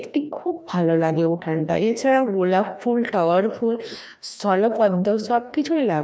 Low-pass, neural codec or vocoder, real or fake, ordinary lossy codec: none; codec, 16 kHz, 1 kbps, FreqCodec, larger model; fake; none